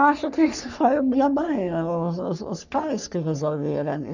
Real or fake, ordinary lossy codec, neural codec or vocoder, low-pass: fake; none; codec, 44.1 kHz, 3.4 kbps, Pupu-Codec; 7.2 kHz